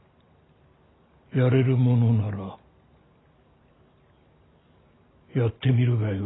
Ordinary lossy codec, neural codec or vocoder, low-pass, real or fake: AAC, 16 kbps; none; 7.2 kHz; real